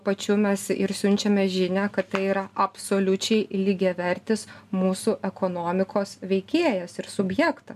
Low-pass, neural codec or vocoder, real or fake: 14.4 kHz; none; real